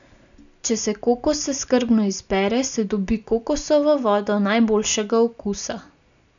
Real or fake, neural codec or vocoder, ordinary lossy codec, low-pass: real; none; none; 7.2 kHz